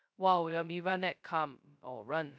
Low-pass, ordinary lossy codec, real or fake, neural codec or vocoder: none; none; fake; codec, 16 kHz, 0.2 kbps, FocalCodec